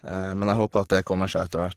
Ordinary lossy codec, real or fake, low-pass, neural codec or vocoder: Opus, 16 kbps; fake; 14.4 kHz; codec, 44.1 kHz, 3.4 kbps, Pupu-Codec